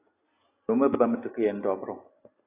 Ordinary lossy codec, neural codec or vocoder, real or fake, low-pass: AAC, 16 kbps; none; real; 3.6 kHz